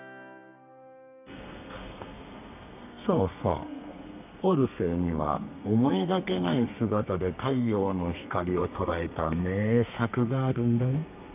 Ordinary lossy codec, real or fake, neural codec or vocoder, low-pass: none; fake; codec, 32 kHz, 1.9 kbps, SNAC; 3.6 kHz